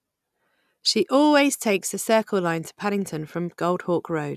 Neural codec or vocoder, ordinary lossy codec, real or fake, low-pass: none; none; real; 14.4 kHz